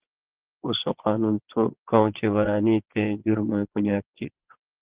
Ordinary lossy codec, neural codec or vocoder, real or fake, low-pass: Opus, 16 kbps; vocoder, 22.05 kHz, 80 mel bands, Vocos; fake; 3.6 kHz